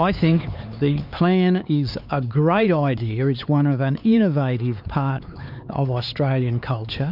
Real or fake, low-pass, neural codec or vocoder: fake; 5.4 kHz; codec, 16 kHz, 4 kbps, X-Codec, HuBERT features, trained on LibriSpeech